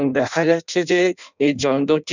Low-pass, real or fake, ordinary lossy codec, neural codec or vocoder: 7.2 kHz; fake; none; codec, 16 kHz in and 24 kHz out, 0.6 kbps, FireRedTTS-2 codec